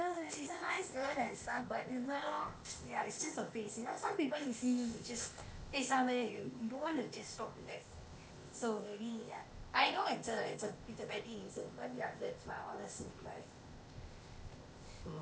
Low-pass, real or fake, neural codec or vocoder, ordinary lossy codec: none; fake; codec, 16 kHz, 0.8 kbps, ZipCodec; none